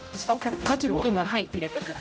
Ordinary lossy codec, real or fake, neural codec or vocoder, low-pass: none; fake; codec, 16 kHz, 0.5 kbps, X-Codec, HuBERT features, trained on general audio; none